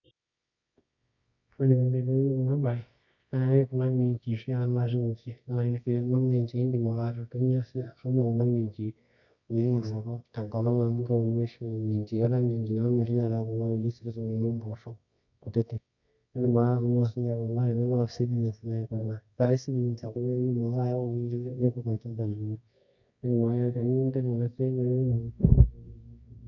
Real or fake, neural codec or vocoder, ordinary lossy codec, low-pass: fake; codec, 24 kHz, 0.9 kbps, WavTokenizer, medium music audio release; none; 7.2 kHz